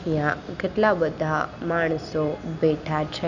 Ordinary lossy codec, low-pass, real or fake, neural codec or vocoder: none; 7.2 kHz; real; none